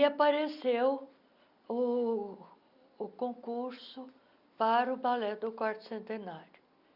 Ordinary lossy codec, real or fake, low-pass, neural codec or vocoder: none; real; 5.4 kHz; none